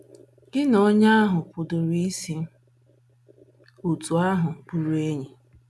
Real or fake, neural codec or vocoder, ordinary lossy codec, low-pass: real; none; none; none